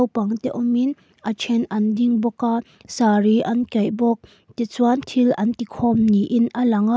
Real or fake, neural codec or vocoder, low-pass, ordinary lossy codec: real; none; none; none